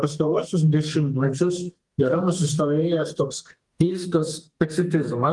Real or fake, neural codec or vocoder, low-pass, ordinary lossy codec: fake; codec, 32 kHz, 1.9 kbps, SNAC; 10.8 kHz; Opus, 24 kbps